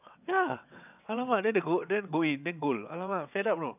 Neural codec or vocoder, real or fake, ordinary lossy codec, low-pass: vocoder, 22.05 kHz, 80 mel bands, WaveNeXt; fake; none; 3.6 kHz